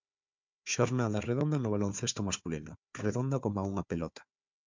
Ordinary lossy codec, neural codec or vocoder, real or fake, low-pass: MP3, 64 kbps; codec, 16 kHz, 4 kbps, FunCodec, trained on Chinese and English, 50 frames a second; fake; 7.2 kHz